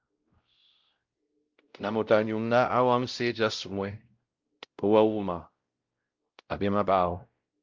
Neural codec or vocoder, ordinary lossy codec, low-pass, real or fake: codec, 16 kHz, 0.5 kbps, X-Codec, WavLM features, trained on Multilingual LibriSpeech; Opus, 32 kbps; 7.2 kHz; fake